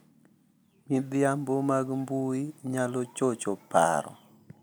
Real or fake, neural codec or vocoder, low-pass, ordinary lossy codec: real; none; none; none